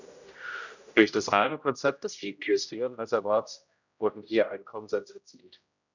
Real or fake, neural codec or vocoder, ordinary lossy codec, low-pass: fake; codec, 16 kHz, 0.5 kbps, X-Codec, HuBERT features, trained on general audio; none; 7.2 kHz